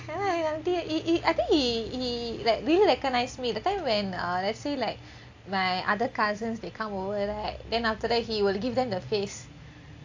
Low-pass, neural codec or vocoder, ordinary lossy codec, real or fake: 7.2 kHz; none; AAC, 48 kbps; real